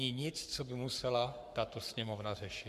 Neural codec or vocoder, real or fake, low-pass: codec, 44.1 kHz, 7.8 kbps, Pupu-Codec; fake; 14.4 kHz